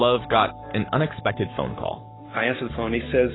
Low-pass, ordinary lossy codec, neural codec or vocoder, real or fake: 7.2 kHz; AAC, 16 kbps; codec, 44.1 kHz, 7.8 kbps, Pupu-Codec; fake